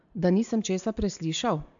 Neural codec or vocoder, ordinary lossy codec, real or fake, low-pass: none; AAC, 64 kbps; real; 7.2 kHz